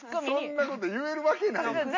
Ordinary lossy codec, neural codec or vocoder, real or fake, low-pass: MP3, 48 kbps; none; real; 7.2 kHz